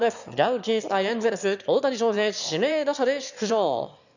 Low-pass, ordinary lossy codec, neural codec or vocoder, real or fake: 7.2 kHz; none; autoencoder, 22.05 kHz, a latent of 192 numbers a frame, VITS, trained on one speaker; fake